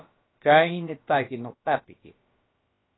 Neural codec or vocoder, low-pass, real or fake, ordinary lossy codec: codec, 16 kHz, about 1 kbps, DyCAST, with the encoder's durations; 7.2 kHz; fake; AAC, 16 kbps